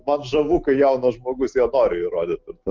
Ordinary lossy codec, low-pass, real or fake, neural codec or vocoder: Opus, 32 kbps; 7.2 kHz; real; none